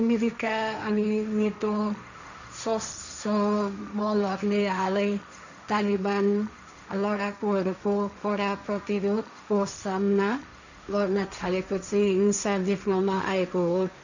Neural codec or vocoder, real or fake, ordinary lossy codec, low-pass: codec, 16 kHz, 1.1 kbps, Voila-Tokenizer; fake; none; 7.2 kHz